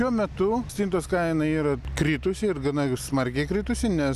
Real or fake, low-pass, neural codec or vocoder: real; 14.4 kHz; none